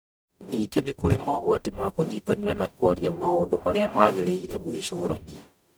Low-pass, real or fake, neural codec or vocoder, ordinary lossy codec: none; fake; codec, 44.1 kHz, 0.9 kbps, DAC; none